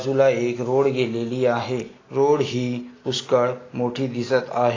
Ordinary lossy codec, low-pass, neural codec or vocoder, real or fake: AAC, 32 kbps; 7.2 kHz; none; real